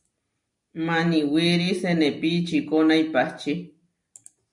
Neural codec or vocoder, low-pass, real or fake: none; 10.8 kHz; real